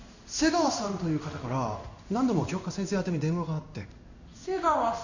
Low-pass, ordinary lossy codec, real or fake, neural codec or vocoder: 7.2 kHz; none; fake; codec, 16 kHz in and 24 kHz out, 1 kbps, XY-Tokenizer